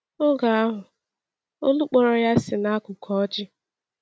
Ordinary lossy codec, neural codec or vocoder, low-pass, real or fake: none; none; none; real